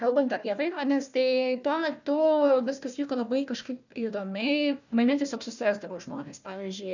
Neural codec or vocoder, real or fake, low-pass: codec, 16 kHz, 1 kbps, FunCodec, trained on Chinese and English, 50 frames a second; fake; 7.2 kHz